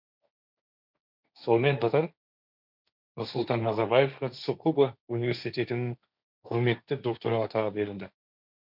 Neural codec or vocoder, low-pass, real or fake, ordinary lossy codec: codec, 16 kHz, 1.1 kbps, Voila-Tokenizer; 5.4 kHz; fake; none